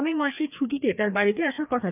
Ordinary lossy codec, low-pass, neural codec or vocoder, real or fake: none; 3.6 kHz; codec, 16 kHz, 2 kbps, FreqCodec, larger model; fake